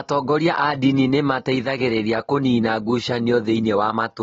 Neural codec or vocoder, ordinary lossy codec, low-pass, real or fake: none; AAC, 24 kbps; 7.2 kHz; real